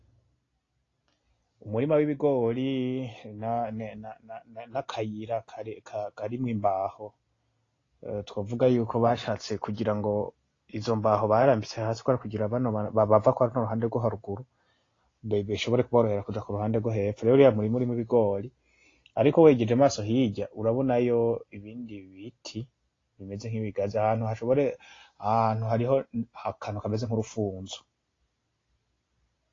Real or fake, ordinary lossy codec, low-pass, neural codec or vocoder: real; AAC, 32 kbps; 7.2 kHz; none